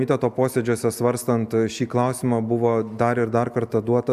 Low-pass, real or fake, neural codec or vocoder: 14.4 kHz; real; none